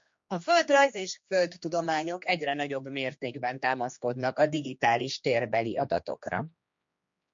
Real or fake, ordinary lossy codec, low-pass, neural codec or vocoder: fake; MP3, 48 kbps; 7.2 kHz; codec, 16 kHz, 2 kbps, X-Codec, HuBERT features, trained on general audio